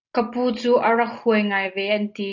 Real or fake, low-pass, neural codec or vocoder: real; 7.2 kHz; none